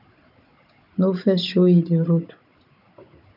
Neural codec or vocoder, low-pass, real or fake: codec, 16 kHz, 16 kbps, FunCodec, trained on Chinese and English, 50 frames a second; 5.4 kHz; fake